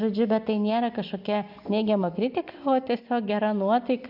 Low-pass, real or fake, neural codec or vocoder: 5.4 kHz; real; none